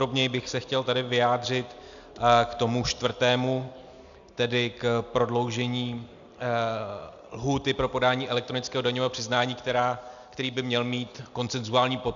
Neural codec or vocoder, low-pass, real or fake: none; 7.2 kHz; real